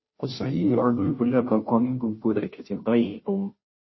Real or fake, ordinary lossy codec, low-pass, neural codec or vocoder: fake; MP3, 24 kbps; 7.2 kHz; codec, 16 kHz, 0.5 kbps, FunCodec, trained on Chinese and English, 25 frames a second